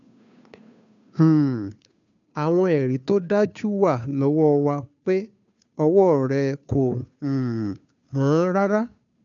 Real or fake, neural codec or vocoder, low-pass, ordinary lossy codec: fake; codec, 16 kHz, 2 kbps, FunCodec, trained on Chinese and English, 25 frames a second; 7.2 kHz; none